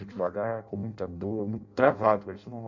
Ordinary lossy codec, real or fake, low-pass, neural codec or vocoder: MP3, 48 kbps; fake; 7.2 kHz; codec, 16 kHz in and 24 kHz out, 0.6 kbps, FireRedTTS-2 codec